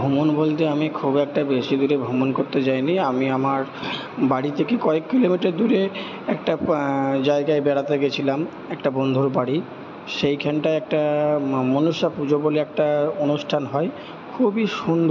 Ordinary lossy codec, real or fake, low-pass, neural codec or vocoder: MP3, 64 kbps; real; 7.2 kHz; none